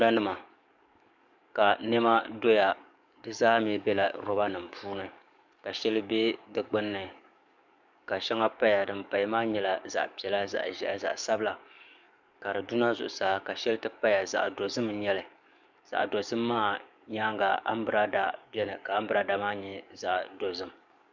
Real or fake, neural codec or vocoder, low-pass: fake; codec, 44.1 kHz, 7.8 kbps, DAC; 7.2 kHz